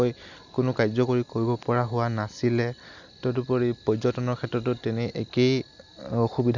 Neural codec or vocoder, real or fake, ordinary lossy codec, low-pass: none; real; none; 7.2 kHz